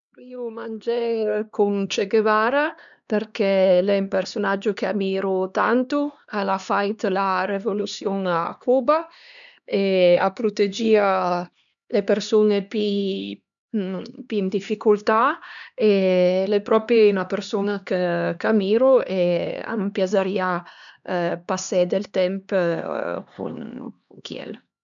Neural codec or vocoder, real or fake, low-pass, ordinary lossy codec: codec, 16 kHz, 2 kbps, X-Codec, HuBERT features, trained on LibriSpeech; fake; 7.2 kHz; none